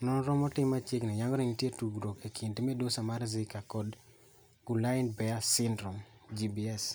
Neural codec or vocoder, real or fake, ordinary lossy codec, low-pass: none; real; none; none